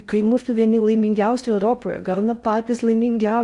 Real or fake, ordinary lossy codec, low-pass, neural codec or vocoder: fake; Opus, 64 kbps; 10.8 kHz; codec, 16 kHz in and 24 kHz out, 0.6 kbps, FocalCodec, streaming, 4096 codes